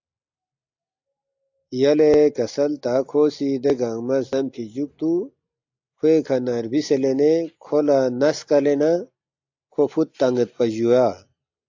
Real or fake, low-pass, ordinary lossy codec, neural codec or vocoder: real; 7.2 kHz; AAC, 48 kbps; none